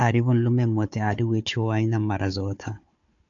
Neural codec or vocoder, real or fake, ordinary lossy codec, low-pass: codec, 16 kHz, 4 kbps, FunCodec, trained on Chinese and English, 50 frames a second; fake; AAC, 64 kbps; 7.2 kHz